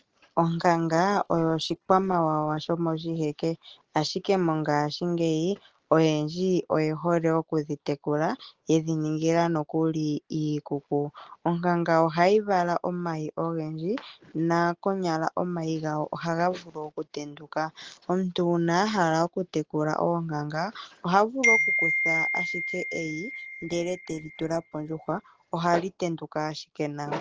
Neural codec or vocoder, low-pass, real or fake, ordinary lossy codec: none; 7.2 kHz; real; Opus, 16 kbps